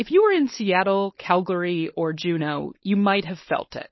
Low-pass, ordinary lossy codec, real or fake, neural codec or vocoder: 7.2 kHz; MP3, 24 kbps; fake; codec, 16 kHz, 4.8 kbps, FACodec